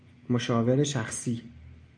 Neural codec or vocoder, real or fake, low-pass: none; real; 9.9 kHz